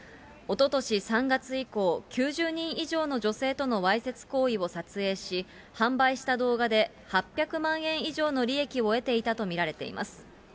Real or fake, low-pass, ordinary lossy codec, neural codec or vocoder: real; none; none; none